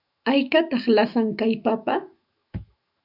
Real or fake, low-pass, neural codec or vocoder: fake; 5.4 kHz; autoencoder, 48 kHz, 128 numbers a frame, DAC-VAE, trained on Japanese speech